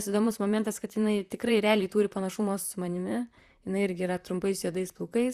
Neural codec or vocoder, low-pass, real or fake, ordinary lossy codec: vocoder, 44.1 kHz, 128 mel bands, Pupu-Vocoder; 14.4 kHz; fake; Opus, 64 kbps